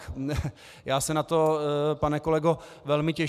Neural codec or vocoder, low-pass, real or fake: none; 14.4 kHz; real